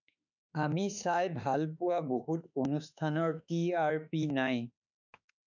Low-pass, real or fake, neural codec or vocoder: 7.2 kHz; fake; autoencoder, 48 kHz, 32 numbers a frame, DAC-VAE, trained on Japanese speech